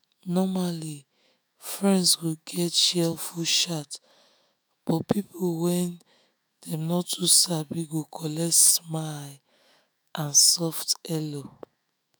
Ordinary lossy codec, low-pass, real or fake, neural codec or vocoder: none; none; fake; autoencoder, 48 kHz, 128 numbers a frame, DAC-VAE, trained on Japanese speech